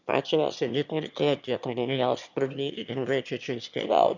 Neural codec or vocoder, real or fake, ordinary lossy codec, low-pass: autoencoder, 22.05 kHz, a latent of 192 numbers a frame, VITS, trained on one speaker; fake; none; 7.2 kHz